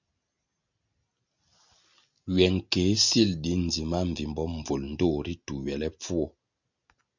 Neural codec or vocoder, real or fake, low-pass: none; real; 7.2 kHz